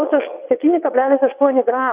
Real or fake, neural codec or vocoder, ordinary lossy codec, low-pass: fake; vocoder, 22.05 kHz, 80 mel bands, WaveNeXt; Opus, 64 kbps; 3.6 kHz